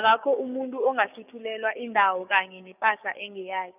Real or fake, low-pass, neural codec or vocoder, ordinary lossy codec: fake; 3.6 kHz; codec, 16 kHz, 6 kbps, DAC; none